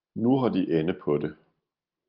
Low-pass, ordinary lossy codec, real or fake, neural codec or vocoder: 5.4 kHz; Opus, 32 kbps; real; none